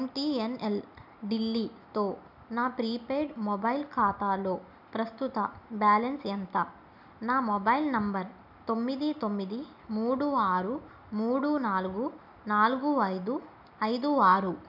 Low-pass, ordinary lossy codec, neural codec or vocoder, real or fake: 5.4 kHz; none; none; real